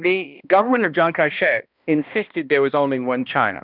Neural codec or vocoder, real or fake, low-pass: codec, 16 kHz, 1 kbps, X-Codec, HuBERT features, trained on balanced general audio; fake; 5.4 kHz